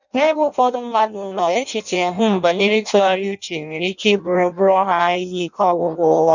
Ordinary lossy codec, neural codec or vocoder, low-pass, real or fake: none; codec, 16 kHz in and 24 kHz out, 0.6 kbps, FireRedTTS-2 codec; 7.2 kHz; fake